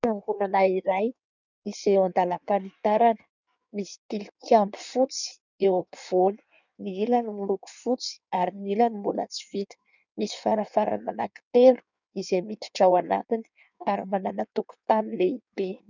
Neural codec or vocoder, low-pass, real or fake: codec, 16 kHz in and 24 kHz out, 1.1 kbps, FireRedTTS-2 codec; 7.2 kHz; fake